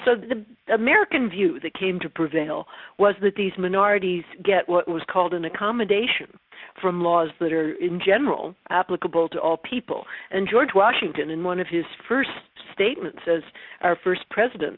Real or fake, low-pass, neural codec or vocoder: real; 5.4 kHz; none